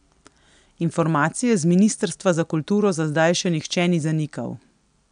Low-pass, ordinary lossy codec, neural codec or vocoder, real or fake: 9.9 kHz; none; none; real